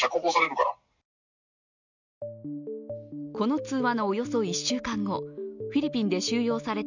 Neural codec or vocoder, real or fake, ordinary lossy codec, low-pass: none; real; none; 7.2 kHz